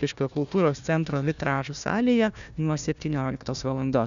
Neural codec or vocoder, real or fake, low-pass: codec, 16 kHz, 1 kbps, FunCodec, trained on Chinese and English, 50 frames a second; fake; 7.2 kHz